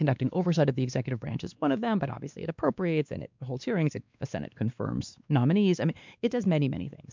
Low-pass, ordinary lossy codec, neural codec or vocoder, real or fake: 7.2 kHz; MP3, 64 kbps; codec, 16 kHz, 2 kbps, X-Codec, WavLM features, trained on Multilingual LibriSpeech; fake